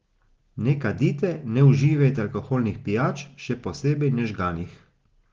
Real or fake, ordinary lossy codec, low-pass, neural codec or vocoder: real; Opus, 16 kbps; 7.2 kHz; none